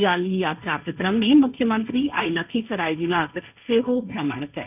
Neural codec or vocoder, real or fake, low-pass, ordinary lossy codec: codec, 16 kHz, 1.1 kbps, Voila-Tokenizer; fake; 3.6 kHz; none